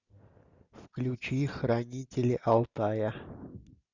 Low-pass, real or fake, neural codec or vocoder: 7.2 kHz; real; none